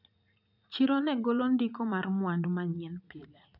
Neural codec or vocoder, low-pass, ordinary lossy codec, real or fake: vocoder, 44.1 kHz, 80 mel bands, Vocos; 5.4 kHz; none; fake